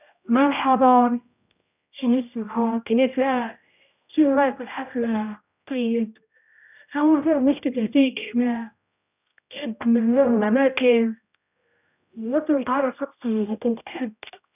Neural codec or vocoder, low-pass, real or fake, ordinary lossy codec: codec, 16 kHz, 0.5 kbps, X-Codec, HuBERT features, trained on general audio; 3.6 kHz; fake; none